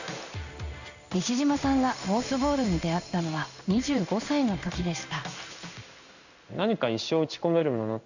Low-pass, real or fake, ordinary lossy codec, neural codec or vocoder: 7.2 kHz; fake; none; codec, 16 kHz in and 24 kHz out, 1 kbps, XY-Tokenizer